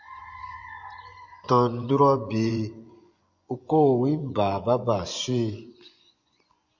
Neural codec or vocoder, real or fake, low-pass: vocoder, 24 kHz, 100 mel bands, Vocos; fake; 7.2 kHz